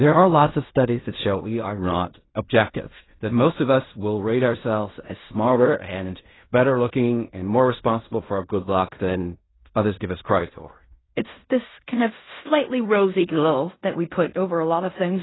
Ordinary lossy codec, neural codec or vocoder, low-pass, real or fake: AAC, 16 kbps; codec, 16 kHz in and 24 kHz out, 0.4 kbps, LongCat-Audio-Codec, fine tuned four codebook decoder; 7.2 kHz; fake